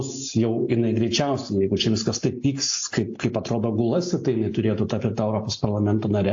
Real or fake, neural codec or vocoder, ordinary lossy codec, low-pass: real; none; AAC, 48 kbps; 7.2 kHz